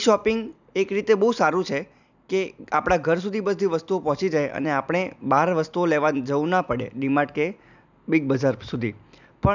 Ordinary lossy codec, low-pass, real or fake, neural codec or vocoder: none; 7.2 kHz; real; none